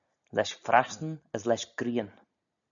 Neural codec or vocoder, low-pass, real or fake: none; 7.2 kHz; real